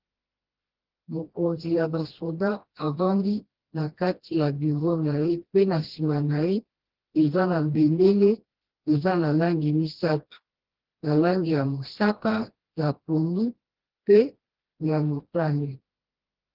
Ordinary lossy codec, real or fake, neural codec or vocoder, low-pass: Opus, 16 kbps; fake; codec, 16 kHz, 1 kbps, FreqCodec, smaller model; 5.4 kHz